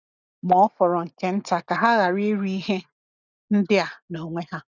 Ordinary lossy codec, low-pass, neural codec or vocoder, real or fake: none; 7.2 kHz; none; real